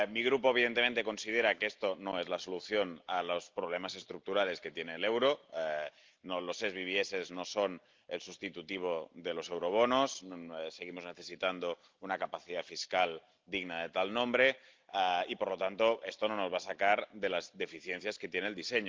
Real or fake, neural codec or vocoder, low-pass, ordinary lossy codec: real; none; 7.2 kHz; Opus, 32 kbps